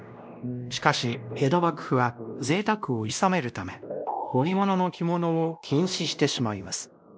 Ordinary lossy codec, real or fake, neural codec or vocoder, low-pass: none; fake; codec, 16 kHz, 1 kbps, X-Codec, WavLM features, trained on Multilingual LibriSpeech; none